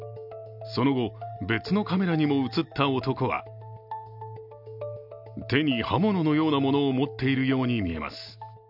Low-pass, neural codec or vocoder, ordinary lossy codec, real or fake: 5.4 kHz; none; none; real